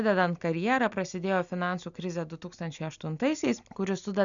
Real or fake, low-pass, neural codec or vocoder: real; 7.2 kHz; none